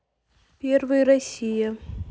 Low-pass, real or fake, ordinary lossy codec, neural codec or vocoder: none; real; none; none